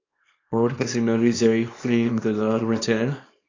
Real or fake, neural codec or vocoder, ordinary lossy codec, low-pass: fake; codec, 24 kHz, 0.9 kbps, WavTokenizer, small release; AAC, 32 kbps; 7.2 kHz